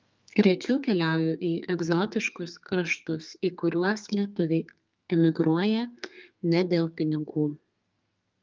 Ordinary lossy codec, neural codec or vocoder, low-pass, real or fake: Opus, 24 kbps; codec, 32 kHz, 1.9 kbps, SNAC; 7.2 kHz; fake